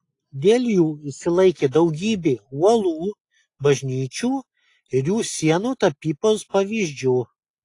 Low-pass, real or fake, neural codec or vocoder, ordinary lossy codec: 10.8 kHz; real; none; AAC, 48 kbps